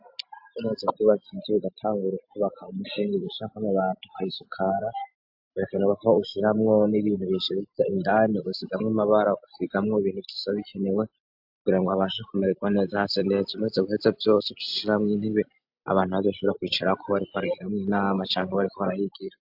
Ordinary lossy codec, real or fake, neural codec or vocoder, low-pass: AAC, 48 kbps; real; none; 5.4 kHz